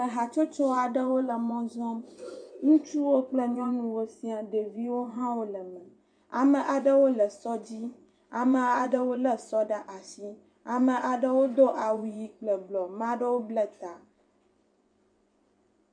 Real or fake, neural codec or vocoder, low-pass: fake; vocoder, 24 kHz, 100 mel bands, Vocos; 9.9 kHz